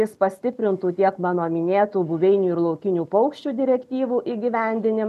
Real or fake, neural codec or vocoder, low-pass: real; none; 14.4 kHz